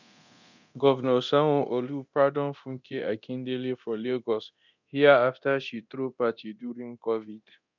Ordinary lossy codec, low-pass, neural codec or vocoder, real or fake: none; 7.2 kHz; codec, 24 kHz, 0.9 kbps, DualCodec; fake